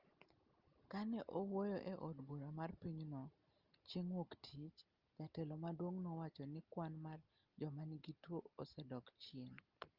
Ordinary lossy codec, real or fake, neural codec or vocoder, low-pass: Opus, 32 kbps; real; none; 5.4 kHz